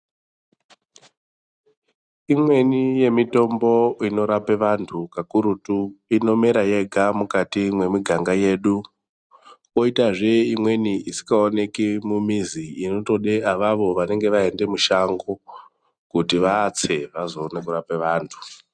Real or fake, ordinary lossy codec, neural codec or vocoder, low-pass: real; Opus, 64 kbps; none; 9.9 kHz